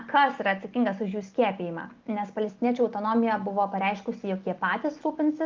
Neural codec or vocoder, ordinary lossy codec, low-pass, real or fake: none; Opus, 24 kbps; 7.2 kHz; real